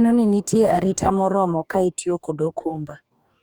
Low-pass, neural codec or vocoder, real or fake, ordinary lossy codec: 19.8 kHz; codec, 44.1 kHz, 2.6 kbps, DAC; fake; Opus, 64 kbps